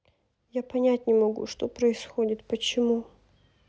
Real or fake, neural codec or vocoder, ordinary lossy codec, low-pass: real; none; none; none